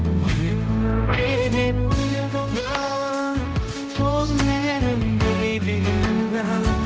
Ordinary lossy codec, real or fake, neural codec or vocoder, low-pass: none; fake; codec, 16 kHz, 0.5 kbps, X-Codec, HuBERT features, trained on general audio; none